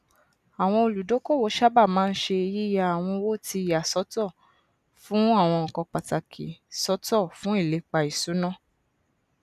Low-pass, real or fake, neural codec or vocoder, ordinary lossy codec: 14.4 kHz; real; none; none